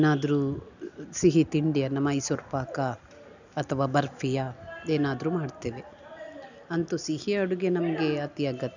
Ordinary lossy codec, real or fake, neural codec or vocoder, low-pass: none; real; none; 7.2 kHz